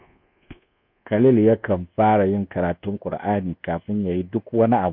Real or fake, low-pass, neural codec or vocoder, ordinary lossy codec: fake; 5.4 kHz; codec, 24 kHz, 1.2 kbps, DualCodec; none